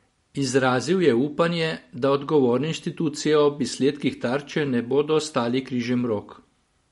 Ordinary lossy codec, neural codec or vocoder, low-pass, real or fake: MP3, 48 kbps; none; 19.8 kHz; real